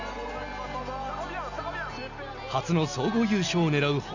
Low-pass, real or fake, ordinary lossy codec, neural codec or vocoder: 7.2 kHz; real; none; none